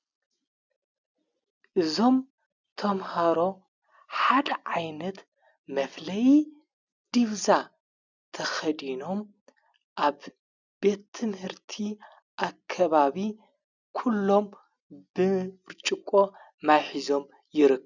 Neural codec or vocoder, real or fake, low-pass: none; real; 7.2 kHz